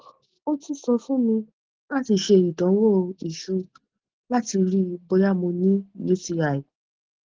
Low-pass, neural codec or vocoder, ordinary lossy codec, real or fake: 7.2 kHz; none; Opus, 16 kbps; real